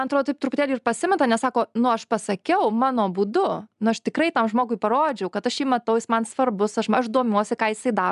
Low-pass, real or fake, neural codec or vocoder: 10.8 kHz; real; none